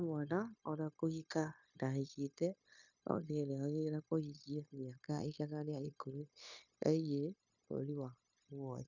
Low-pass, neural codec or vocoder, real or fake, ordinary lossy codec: 7.2 kHz; codec, 16 kHz, 0.9 kbps, LongCat-Audio-Codec; fake; none